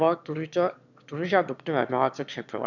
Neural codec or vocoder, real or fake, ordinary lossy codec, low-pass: autoencoder, 22.05 kHz, a latent of 192 numbers a frame, VITS, trained on one speaker; fake; none; 7.2 kHz